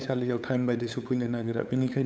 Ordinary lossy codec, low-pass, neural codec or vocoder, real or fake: none; none; codec, 16 kHz, 8 kbps, FunCodec, trained on LibriTTS, 25 frames a second; fake